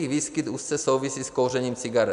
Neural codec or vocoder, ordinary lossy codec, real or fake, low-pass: none; AAC, 96 kbps; real; 10.8 kHz